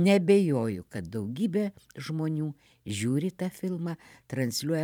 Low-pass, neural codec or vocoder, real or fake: 19.8 kHz; vocoder, 44.1 kHz, 128 mel bands every 512 samples, BigVGAN v2; fake